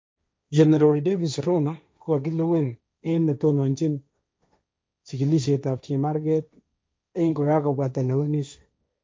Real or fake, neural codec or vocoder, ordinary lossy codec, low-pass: fake; codec, 16 kHz, 1.1 kbps, Voila-Tokenizer; none; none